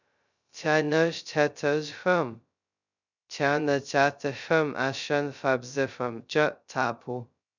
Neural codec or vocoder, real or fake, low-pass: codec, 16 kHz, 0.2 kbps, FocalCodec; fake; 7.2 kHz